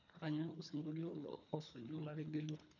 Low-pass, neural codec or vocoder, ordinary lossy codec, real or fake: 7.2 kHz; codec, 24 kHz, 3 kbps, HILCodec; none; fake